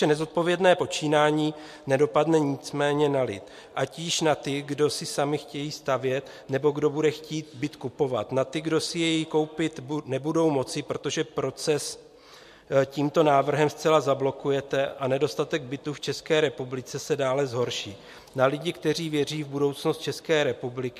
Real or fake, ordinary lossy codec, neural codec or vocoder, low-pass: real; MP3, 64 kbps; none; 14.4 kHz